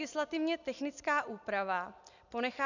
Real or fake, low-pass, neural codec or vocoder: real; 7.2 kHz; none